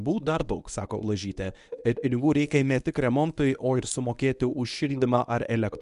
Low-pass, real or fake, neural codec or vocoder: 10.8 kHz; fake; codec, 24 kHz, 0.9 kbps, WavTokenizer, medium speech release version 1